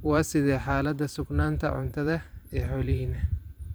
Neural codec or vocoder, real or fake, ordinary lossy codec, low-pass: vocoder, 44.1 kHz, 128 mel bands every 512 samples, BigVGAN v2; fake; none; none